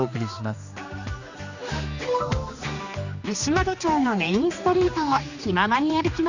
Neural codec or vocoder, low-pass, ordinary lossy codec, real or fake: codec, 16 kHz, 2 kbps, X-Codec, HuBERT features, trained on general audio; 7.2 kHz; Opus, 64 kbps; fake